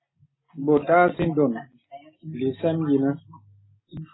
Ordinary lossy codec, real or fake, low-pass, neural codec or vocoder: AAC, 16 kbps; real; 7.2 kHz; none